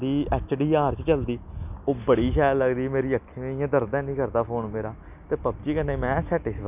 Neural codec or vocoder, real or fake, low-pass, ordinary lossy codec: none; real; 3.6 kHz; none